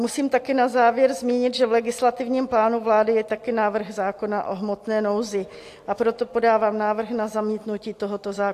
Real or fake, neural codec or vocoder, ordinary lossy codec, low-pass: real; none; AAC, 64 kbps; 14.4 kHz